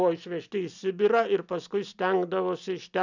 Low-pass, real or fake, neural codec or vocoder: 7.2 kHz; real; none